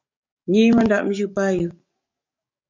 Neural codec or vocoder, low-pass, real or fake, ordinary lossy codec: codec, 44.1 kHz, 7.8 kbps, DAC; 7.2 kHz; fake; MP3, 48 kbps